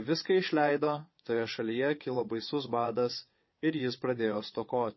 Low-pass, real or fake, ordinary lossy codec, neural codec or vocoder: 7.2 kHz; fake; MP3, 24 kbps; vocoder, 22.05 kHz, 80 mel bands, WaveNeXt